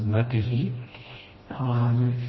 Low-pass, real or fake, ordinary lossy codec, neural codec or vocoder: 7.2 kHz; fake; MP3, 24 kbps; codec, 16 kHz, 1 kbps, FreqCodec, smaller model